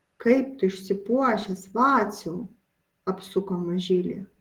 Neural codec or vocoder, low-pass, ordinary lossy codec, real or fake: none; 19.8 kHz; Opus, 16 kbps; real